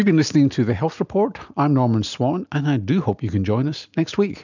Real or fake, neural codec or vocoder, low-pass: real; none; 7.2 kHz